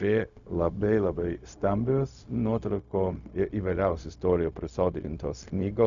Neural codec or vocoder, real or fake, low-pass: codec, 16 kHz, 0.4 kbps, LongCat-Audio-Codec; fake; 7.2 kHz